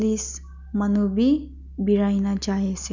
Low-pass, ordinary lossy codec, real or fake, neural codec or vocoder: 7.2 kHz; none; real; none